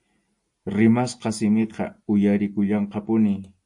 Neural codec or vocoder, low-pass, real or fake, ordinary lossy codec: none; 10.8 kHz; real; AAC, 64 kbps